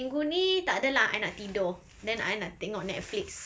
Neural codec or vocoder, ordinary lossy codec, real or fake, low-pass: none; none; real; none